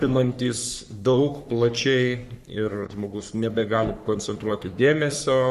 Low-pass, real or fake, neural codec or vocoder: 14.4 kHz; fake; codec, 44.1 kHz, 3.4 kbps, Pupu-Codec